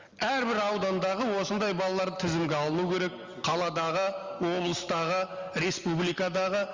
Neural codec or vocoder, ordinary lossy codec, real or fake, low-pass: none; Opus, 32 kbps; real; 7.2 kHz